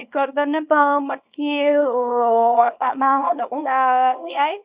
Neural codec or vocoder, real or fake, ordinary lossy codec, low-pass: codec, 24 kHz, 0.9 kbps, WavTokenizer, small release; fake; Opus, 64 kbps; 3.6 kHz